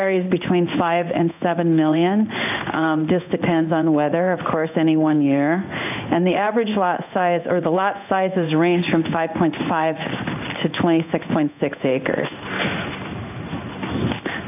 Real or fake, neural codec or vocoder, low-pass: fake; codec, 16 kHz in and 24 kHz out, 1 kbps, XY-Tokenizer; 3.6 kHz